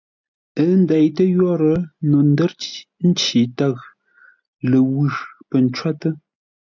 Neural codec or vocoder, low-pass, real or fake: none; 7.2 kHz; real